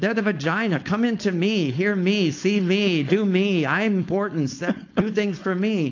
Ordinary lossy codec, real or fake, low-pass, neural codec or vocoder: AAC, 48 kbps; fake; 7.2 kHz; codec, 16 kHz, 4.8 kbps, FACodec